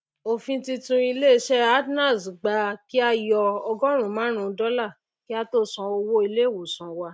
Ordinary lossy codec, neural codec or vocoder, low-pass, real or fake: none; none; none; real